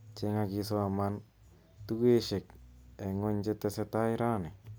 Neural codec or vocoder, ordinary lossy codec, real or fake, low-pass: none; none; real; none